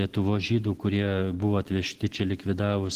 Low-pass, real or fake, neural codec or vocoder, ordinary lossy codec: 14.4 kHz; real; none; Opus, 16 kbps